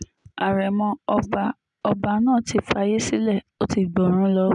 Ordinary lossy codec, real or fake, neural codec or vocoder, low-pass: none; real; none; 10.8 kHz